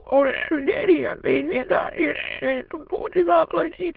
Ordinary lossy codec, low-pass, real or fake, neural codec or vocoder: Opus, 16 kbps; 5.4 kHz; fake; autoencoder, 22.05 kHz, a latent of 192 numbers a frame, VITS, trained on many speakers